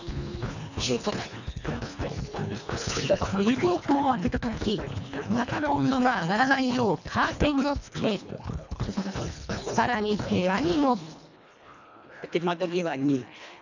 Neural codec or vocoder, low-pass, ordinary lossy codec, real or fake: codec, 24 kHz, 1.5 kbps, HILCodec; 7.2 kHz; none; fake